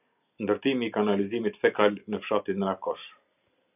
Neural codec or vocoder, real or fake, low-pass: none; real; 3.6 kHz